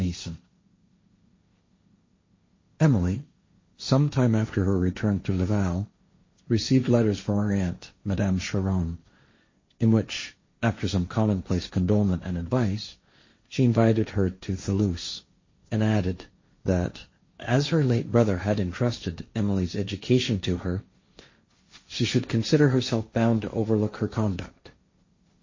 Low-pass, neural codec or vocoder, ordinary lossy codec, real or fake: 7.2 kHz; codec, 16 kHz, 1.1 kbps, Voila-Tokenizer; MP3, 32 kbps; fake